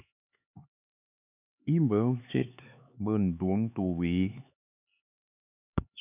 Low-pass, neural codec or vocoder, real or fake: 3.6 kHz; codec, 16 kHz, 4 kbps, X-Codec, HuBERT features, trained on LibriSpeech; fake